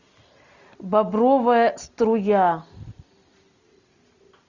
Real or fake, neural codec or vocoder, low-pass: real; none; 7.2 kHz